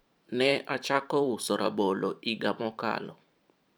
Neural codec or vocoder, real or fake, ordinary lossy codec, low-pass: vocoder, 44.1 kHz, 128 mel bands every 512 samples, BigVGAN v2; fake; none; none